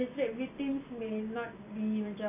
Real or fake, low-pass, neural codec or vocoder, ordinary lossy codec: real; 3.6 kHz; none; MP3, 24 kbps